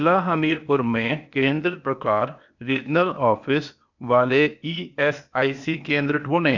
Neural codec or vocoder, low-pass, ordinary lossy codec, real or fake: codec, 16 kHz, 0.8 kbps, ZipCodec; 7.2 kHz; none; fake